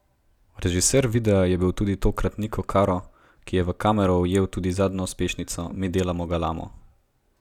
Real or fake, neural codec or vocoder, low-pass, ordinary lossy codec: real; none; 19.8 kHz; none